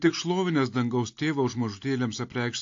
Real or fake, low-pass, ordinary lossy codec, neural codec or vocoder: real; 7.2 kHz; AAC, 48 kbps; none